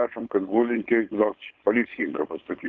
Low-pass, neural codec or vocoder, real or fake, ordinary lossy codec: 7.2 kHz; codec, 16 kHz, 4.8 kbps, FACodec; fake; Opus, 32 kbps